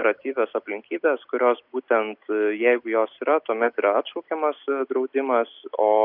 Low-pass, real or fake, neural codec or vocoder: 5.4 kHz; real; none